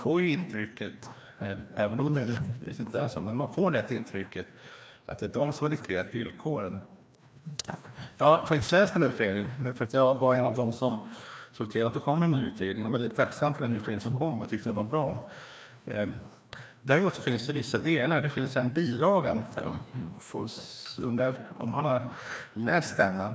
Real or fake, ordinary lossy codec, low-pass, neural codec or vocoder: fake; none; none; codec, 16 kHz, 1 kbps, FreqCodec, larger model